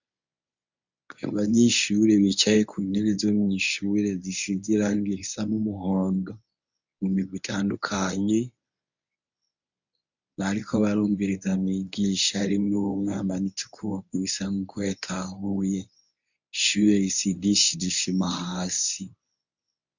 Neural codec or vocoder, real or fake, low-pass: codec, 24 kHz, 0.9 kbps, WavTokenizer, medium speech release version 1; fake; 7.2 kHz